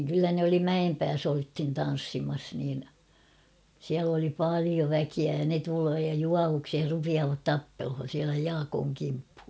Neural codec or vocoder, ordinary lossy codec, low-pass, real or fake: none; none; none; real